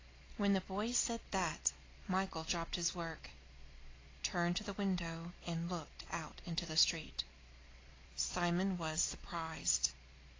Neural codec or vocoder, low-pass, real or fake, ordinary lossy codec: none; 7.2 kHz; real; AAC, 32 kbps